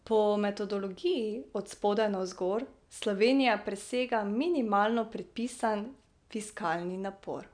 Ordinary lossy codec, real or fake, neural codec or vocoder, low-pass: none; real; none; 9.9 kHz